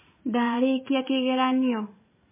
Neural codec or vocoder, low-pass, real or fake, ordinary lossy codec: vocoder, 44.1 kHz, 80 mel bands, Vocos; 3.6 kHz; fake; MP3, 16 kbps